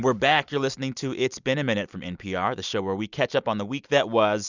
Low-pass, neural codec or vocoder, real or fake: 7.2 kHz; none; real